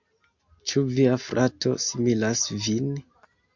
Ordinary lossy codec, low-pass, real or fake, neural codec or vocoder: MP3, 48 kbps; 7.2 kHz; real; none